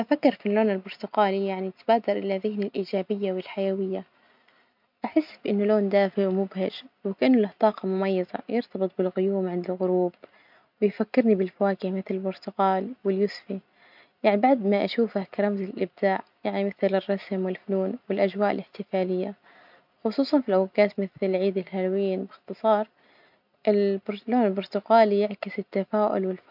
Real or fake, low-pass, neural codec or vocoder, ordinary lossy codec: real; 5.4 kHz; none; MP3, 48 kbps